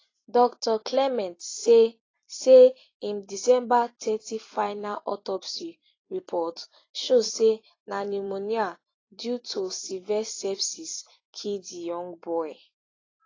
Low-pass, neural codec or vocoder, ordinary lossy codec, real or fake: 7.2 kHz; vocoder, 44.1 kHz, 128 mel bands every 256 samples, BigVGAN v2; AAC, 32 kbps; fake